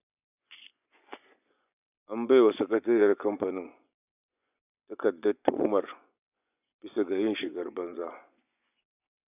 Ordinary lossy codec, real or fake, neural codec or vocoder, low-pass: none; fake; vocoder, 24 kHz, 100 mel bands, Vocos; 3.6 kHz